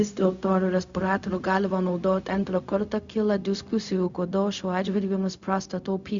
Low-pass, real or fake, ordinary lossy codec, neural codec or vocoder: 7.2 kHz; fake; Opus, 64 kbps; codec, 16 kHz, 0.4 kbps, LongCat-Audio-Codec